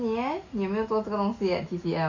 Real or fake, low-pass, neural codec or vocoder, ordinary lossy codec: real; 7.2 kHz; none; AAC, 48 kbps